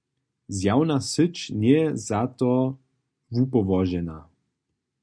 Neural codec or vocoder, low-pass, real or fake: none; 9.9 kHz; real